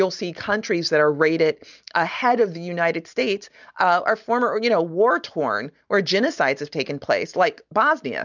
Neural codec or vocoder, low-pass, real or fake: none; 7.2 kHz; real